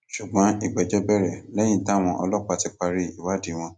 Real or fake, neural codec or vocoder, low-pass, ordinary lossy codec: real; none; none; none